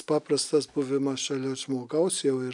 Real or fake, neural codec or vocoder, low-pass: real; none; 10.8 kHz